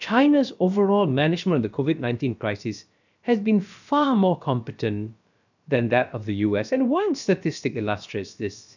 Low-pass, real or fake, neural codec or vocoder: 7.2 kHz; fake; codec, 16 kHz, about 1 kbps, DyCAST, with the encoder's durations